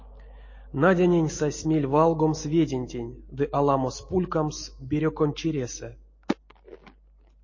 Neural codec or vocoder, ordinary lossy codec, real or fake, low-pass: none; MP3, 32 kbps; real; 7.2 kHz